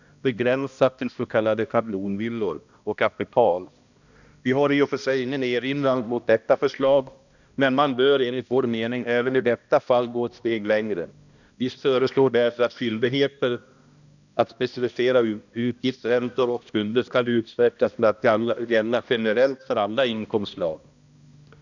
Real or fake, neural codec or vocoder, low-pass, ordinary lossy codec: fake; codec, 16 kHz, 1 kbps, X-Codec, HuBERT features, trained on balanced general audio; 7.2 kHz; none